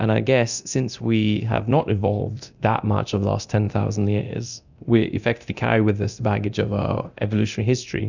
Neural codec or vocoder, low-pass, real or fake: codec, 24 kHz, 0.9 kbps, DualCodec; 7.2 kHz; fake